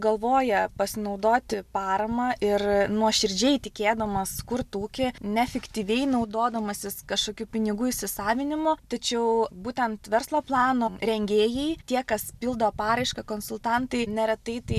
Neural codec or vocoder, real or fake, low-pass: none; real; 14.4 kHz